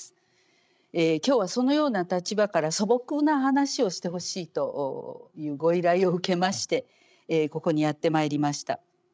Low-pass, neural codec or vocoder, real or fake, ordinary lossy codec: none; codec, 16 kHz, 16 kbps, FreqCodec, larger model; fake; none